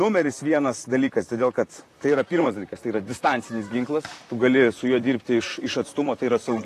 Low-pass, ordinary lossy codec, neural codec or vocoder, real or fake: 14.4 kHz; AAC, 48 kbps; vocoder, 44.1 kHz, 128 mel bands, Pupu-Vocoder; fake